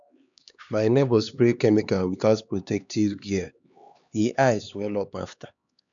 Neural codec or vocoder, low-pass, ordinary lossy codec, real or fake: codec, 16 kHz, 2 kbps, X-Codec, HuBERT features, trained on LibriSpeech; 7.2 kHz; none; fake